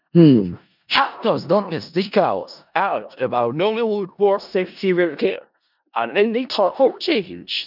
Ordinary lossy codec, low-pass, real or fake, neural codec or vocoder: none; 5.4 kHz; fake; codec, 16 kHz in and 24 kHz out, 0.4 kbps, LongCat-Audio-Codec, four codebook decoder